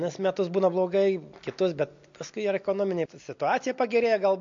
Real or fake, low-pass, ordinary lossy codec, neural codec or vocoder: real; 7.2 kHz; MP3, 48 kbps; none